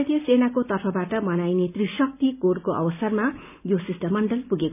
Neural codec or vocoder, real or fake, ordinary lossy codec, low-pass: none; real; none; 3.6 kHz